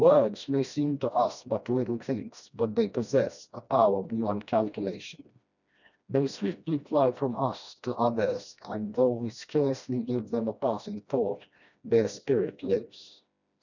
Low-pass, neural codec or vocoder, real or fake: 7.2 kHz; codec, 16 kHz, 1 kbps, FreqCodec, smaller model; fake